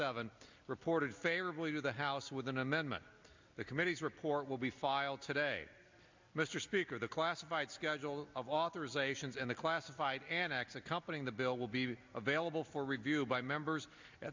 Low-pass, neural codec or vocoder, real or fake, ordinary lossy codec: 7.2 kHz; none; real; MP3, 64 kbps